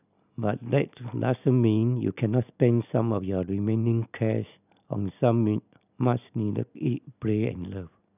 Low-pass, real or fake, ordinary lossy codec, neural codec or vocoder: 3.6 kHz; real; none; none